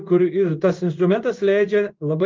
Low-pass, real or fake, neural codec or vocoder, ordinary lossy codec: 7.2 kHz; fake; codec, 16 kHz in and 24 kHz out, 1 kbps, XY-Tokenizer; Opus, 32 kbps